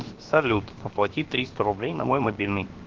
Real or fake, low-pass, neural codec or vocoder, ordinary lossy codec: fake; 7.2 kHz; codec, 16 kHz, 0.7 kbps, FocalCodec; Opus, 16 kbps